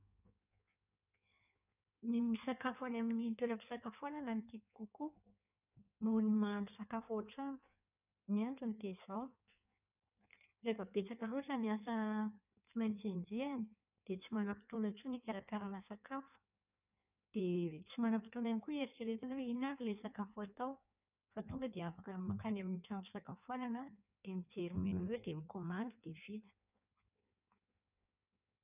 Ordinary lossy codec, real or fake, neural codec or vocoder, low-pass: none; fake; codec, 16 kHz in and 24 kHz out, 1.1 kbps, FireRedTTS-2 codec; 3.6 kHz